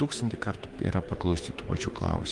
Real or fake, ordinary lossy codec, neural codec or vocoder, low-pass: fake; Opus, 24 kbps; autoencoder, 48 kHz, 32 numbers a frame, DAC-VAE, trained on Japanese speech; 10.8 kHz